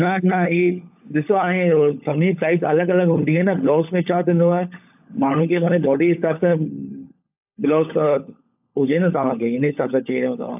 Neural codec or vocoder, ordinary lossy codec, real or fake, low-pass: codec, 16 kHz, 16 kbps, FunCodec, trained on LibriTTS, 50 frames a second; AAC, 32 kbps; fake; 3.6 kHz